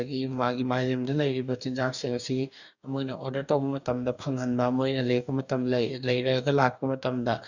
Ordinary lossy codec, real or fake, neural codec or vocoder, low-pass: none; fake; codec, 44.1 kHz, 2.6 kbps, DAC; 7.2 kHz